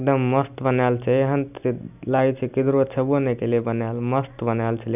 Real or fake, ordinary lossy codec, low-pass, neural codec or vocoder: real; none; 3.6 kHz; none